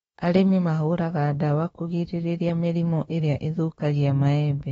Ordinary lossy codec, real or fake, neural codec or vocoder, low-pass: AAC, 24 kbps; fake; autoencoder, 48 kHz, 32 numbers a frame, DAC-VAE, trained on Japanese speech; 19.8 kHz